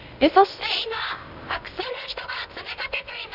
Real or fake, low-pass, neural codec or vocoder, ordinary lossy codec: fake; 5.4 kHz; codec, 16 kHz in and 24 kHz out, 0.6 kbps, FocalCodec, streaming, 2048 codes; none